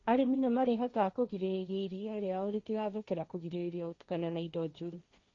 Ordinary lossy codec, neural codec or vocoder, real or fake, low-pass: Opus, 64 kbps; codec, 16 kHz, 1.1 kbps, Voila-Tokenizer; fake; 7.2 kHz